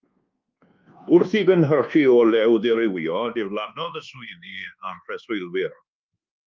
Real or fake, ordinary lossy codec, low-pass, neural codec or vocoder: fake; Opus, 24 kbps; 7.2 kHz; codec, 24 kHz, 1.2 kbps, DualCodec